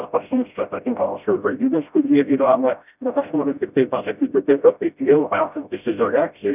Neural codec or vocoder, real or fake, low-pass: codec, 16 kHz, 0.5 kbps, FreqCodec, smaller model; fake; 3.6 kHz